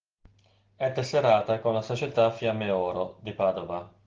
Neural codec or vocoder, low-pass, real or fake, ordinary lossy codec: none; 7.2 kHz; real; Opus, 16 kbps